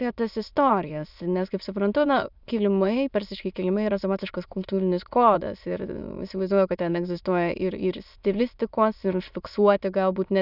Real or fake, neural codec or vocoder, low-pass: fake; autoencoder, 22.05 kHz, a latent of 192 numbers a frame, VITS, trained on many speakers; 5.4 kHz